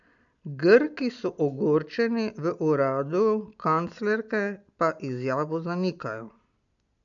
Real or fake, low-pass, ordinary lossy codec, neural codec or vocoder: real; 7.2 kHz; none; none